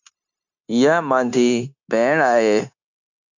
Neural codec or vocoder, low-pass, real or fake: codec, 16 kHz, 0.9 kbps, LongCat-Audio-Codec; 7.2 kHz; fake